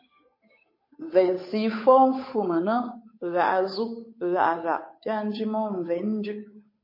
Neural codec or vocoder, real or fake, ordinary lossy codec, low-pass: codec, 16 kHz, 8 kbps, FunCodec, trained on Chinese and English, 25 frames a second; fake; MP3, 24 kbps; 5.4 kHz